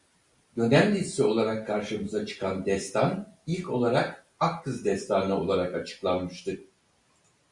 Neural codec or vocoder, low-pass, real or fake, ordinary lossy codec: none; 10.8 kHz; real; Opus, 64 kbps